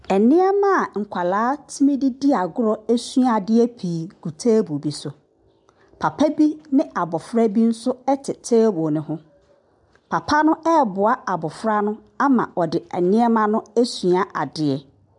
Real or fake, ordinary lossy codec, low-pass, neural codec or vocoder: real; MP3, 96 kbps; 10.8 kHz; none